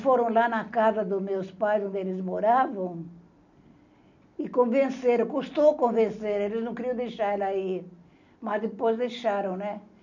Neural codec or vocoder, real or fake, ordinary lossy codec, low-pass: none; real; none; 7.2 kHz